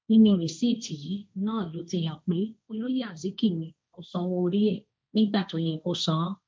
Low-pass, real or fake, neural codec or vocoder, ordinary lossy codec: none; fake; codec, 16 kHz, 1.1 kbps, Voila-Tokenizer; none